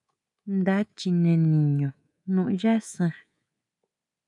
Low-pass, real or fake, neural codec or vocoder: 10.8 kHz; fake; codec, 24 kHz, 3.1 kbps, DualCodec